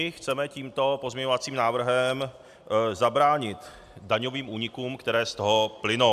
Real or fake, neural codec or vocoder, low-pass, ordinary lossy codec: real; none; 14.4 kHz; AAC, 96 kbps